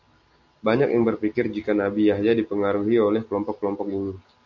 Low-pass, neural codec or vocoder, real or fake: 7.2 kHz; none; real